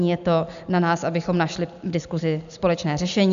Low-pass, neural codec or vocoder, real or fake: 7.2 kHz; none; real